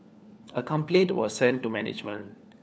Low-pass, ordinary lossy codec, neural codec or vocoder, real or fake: none; none; codec, 16 kHz, 4 kbps, FunCodec, trained on LibriTTS, 50 frames a second; fake